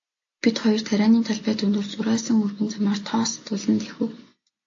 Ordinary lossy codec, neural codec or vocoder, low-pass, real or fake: AAC, 48 kbps; none; 7.2 kHz; real